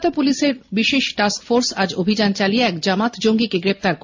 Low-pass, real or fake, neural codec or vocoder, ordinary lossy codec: 7.2 kHz; real; none; MP3, 48 kbps